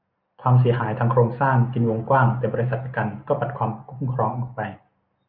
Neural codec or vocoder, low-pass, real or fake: none; 5.4 kHz; real